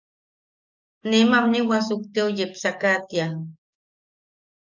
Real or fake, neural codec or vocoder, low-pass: fake; codec, 44.1 kHz, 7.8 kbps, DAC; 7.2 kHz